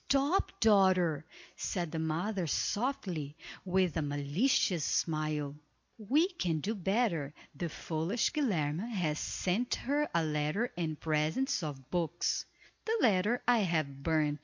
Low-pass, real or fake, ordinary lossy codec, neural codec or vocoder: 7.2 kHz; real; MP3, 48 kbps; none